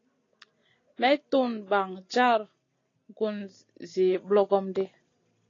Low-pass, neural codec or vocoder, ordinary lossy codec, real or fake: 7.2 kHz; none; AAC, 32 kbps; real